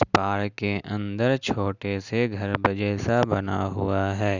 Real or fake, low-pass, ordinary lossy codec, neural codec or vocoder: real; 7.2 kHz; none; none